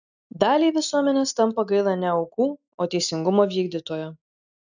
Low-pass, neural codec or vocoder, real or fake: 7.2 kHz; none; real